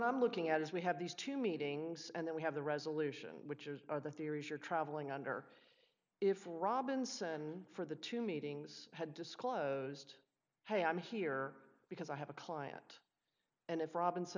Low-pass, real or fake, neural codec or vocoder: 7.2 kHz; real; none